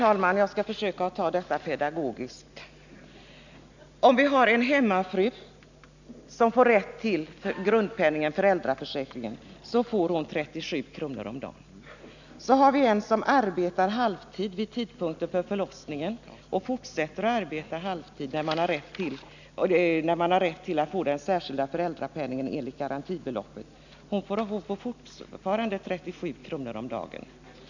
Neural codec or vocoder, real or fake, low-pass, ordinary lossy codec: none; real; 7.2 kHz; none